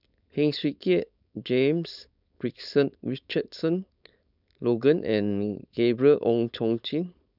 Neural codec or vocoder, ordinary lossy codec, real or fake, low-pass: codec, 16 kHz, 4.8 kbps, FACodec; none; fake; 5.4 kHz